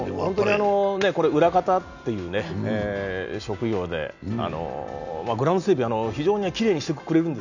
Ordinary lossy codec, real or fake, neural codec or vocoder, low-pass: none; real; none; 7.2 kHz